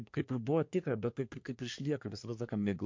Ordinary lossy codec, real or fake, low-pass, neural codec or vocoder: MP3, 48 kbps; fake; 7.2 kHz; codec, 24 kHz, 1 kbps, SNAC